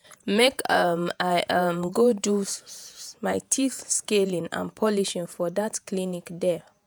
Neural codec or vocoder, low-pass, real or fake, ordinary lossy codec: vocoder, 48 kHz, 128 mel bands, Vocos; none; fake; none